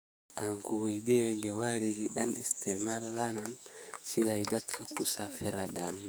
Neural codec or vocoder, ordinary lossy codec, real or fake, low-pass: codec, 44.1 kHz, 2.6 kbps, SNAC; none; fake; none